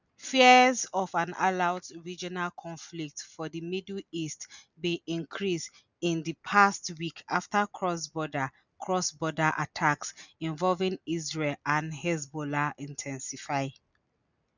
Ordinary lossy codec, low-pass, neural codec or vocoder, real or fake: none; 7.2 kHz; none; real